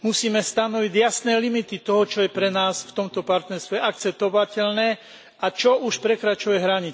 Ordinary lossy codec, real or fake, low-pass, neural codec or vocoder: none; real; none; none